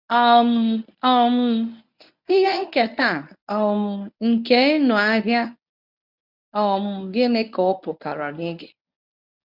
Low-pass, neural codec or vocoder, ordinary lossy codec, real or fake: 5.4 kHz; codec, 24 kHz, 0.9 kbps, WavTokenizer, medium speech release version 1; none; fake